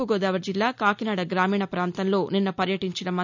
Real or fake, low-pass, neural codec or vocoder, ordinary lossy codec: real; 7.2 kHz; none; none